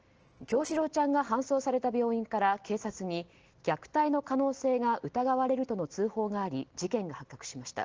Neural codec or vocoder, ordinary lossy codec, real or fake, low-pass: none; Opus, 16 kbps; real; 7.2 kHz